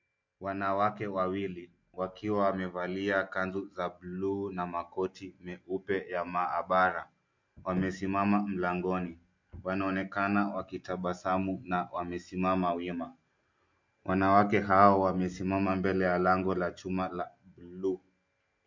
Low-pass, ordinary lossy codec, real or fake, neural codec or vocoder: 7.2 kHz; MP3, 48 kbps; real; none